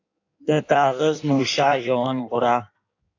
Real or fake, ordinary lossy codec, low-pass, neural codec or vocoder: fake; AAC, 48 kbps; 7.2 kHz; codec, 16 kHz in and 24 kHz out, 1.1 kbps, FireRedTTS-2 codec